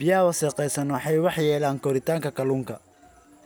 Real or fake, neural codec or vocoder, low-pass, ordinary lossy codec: real; none; none; none